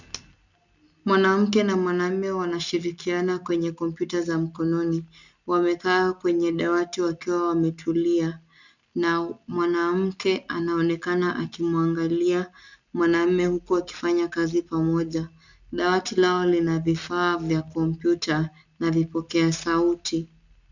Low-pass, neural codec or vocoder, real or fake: 7.2 kHz; none; real